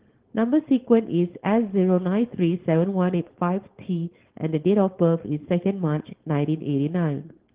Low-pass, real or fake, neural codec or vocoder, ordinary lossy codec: 3.6 kHz; fake; codec, 16 kHz, 4.8 kbps, FACodec; Opus, 16 kbps